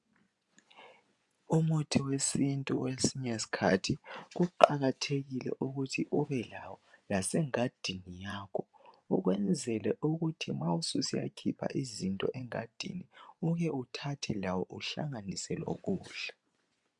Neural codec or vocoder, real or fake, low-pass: vocoder, 24 kHz, 100 mel bands, Vocos; fake; 10.8 kHz